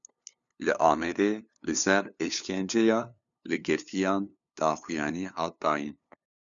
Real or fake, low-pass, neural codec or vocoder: fake; 7.2 kHz; codec, 16 kHz, 2 kbps, FunCodec, trained on LibriTTS, 25 frames a second